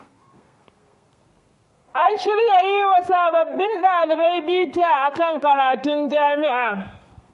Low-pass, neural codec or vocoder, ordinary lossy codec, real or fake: 14.4 kHz; codec, 32 kHz, 1.9 kbps, SNAC; MP3, 48 kbps; fake